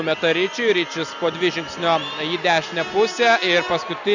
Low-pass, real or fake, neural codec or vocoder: 7.2 kHz; real; none